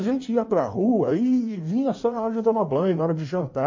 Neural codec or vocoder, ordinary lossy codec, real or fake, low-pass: codec, 16 kHz in and 24 kHz out, 1.1 kbps, FireRedTTS-2 codec; MP3, 32 kbps; fake; 7.2 kHz